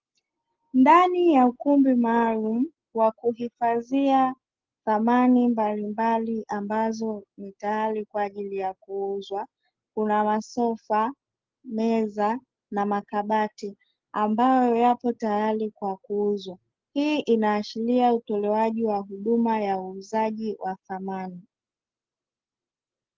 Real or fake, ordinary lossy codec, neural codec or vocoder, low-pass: real; Opus, 16 kbps; none; 7.2 kHz